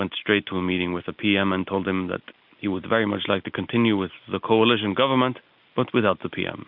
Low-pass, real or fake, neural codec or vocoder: 5.4 kHz; real; none